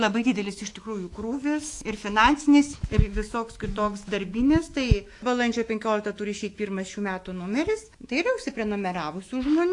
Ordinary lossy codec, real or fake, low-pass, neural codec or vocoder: AAC, 48 kbps; fake; 10.8 kHz; autoencoder, 48 kHz, 128 numbers a frame, DAC-VAE, trained on Japanese speech